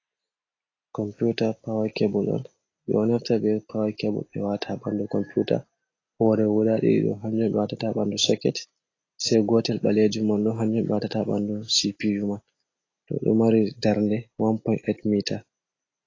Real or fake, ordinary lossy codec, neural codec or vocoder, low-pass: real; AAC, 32 kbps; none; 7.2 kHz